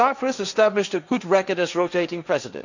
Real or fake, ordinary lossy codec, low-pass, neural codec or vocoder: fake; none; 7.2 kHz; codec, 16 kHz, 1.1 kbps, Voila-Tokenizer